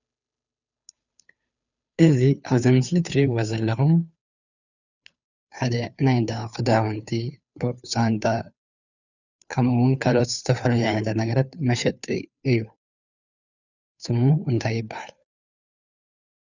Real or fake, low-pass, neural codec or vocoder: fake; 7.2 kHz; codec, 16 kHz, 2 kbps, FunCodec, trained on Chinese and English, 25 frames a second